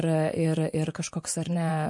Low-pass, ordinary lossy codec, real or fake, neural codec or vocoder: 10.8 kHz; MP3, 48 kbps; fake; vocoder, 24 kHz, 100 mel bands, Vocos